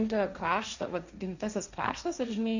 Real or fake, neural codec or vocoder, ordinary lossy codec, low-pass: fake; codec, 16 kHz, 1.1 kbps, Voila-Tokenizer; Opus, 64 kbps; 7.2 kHz